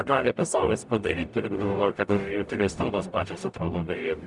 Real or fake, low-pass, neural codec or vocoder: fake; 10.8 kHz; codec, 44.1 kHz, 0.9 kbps, DAC